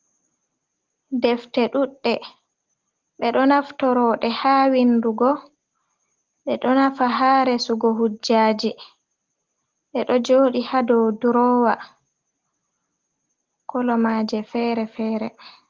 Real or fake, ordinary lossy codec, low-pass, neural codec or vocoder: real; Opus, 16 kbps; 7.2 kHz; none